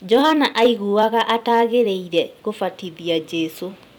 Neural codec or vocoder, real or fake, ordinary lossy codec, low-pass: vocoder, 44.1 kHz, 128 mel bands every 256 samples, BigVGAN v2; fake; none; 19.8 kHz